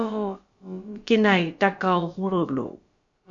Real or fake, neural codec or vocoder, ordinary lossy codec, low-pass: fake; codec, 16 kHz, about 1 kbps, DyCAST, with the encoder's durations; Opus, 64 kbps; 7.2 kHz